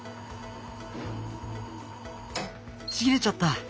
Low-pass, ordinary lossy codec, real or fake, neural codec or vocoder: none; none; real; none